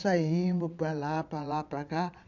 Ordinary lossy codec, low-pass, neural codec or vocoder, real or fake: none; 7.2 kHz; vocoder, 22.05 kHz, 80 mel bands, Vocos; fake